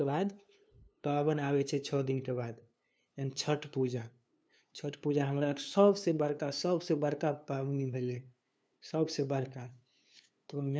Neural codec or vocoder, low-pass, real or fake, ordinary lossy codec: codec, 16 kHz, 2 kbps, FunCodec, trained on LibriTTS, 25 frames a second; none; fake; none